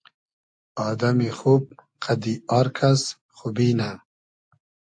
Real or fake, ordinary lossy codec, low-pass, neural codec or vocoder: real; AAC, 64 kbps; 9.9 kHz; none